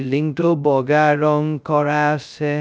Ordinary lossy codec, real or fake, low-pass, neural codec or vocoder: none; fake; none; codec, 16 kHz, 0.2 kbps, FocalCodec